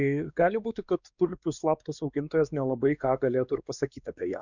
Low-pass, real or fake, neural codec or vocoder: 7.2 kHz; fake; codec, 16 kHz, 2 kbps, X-Codec, WavLM features, trained on Multilingual LibriSpeech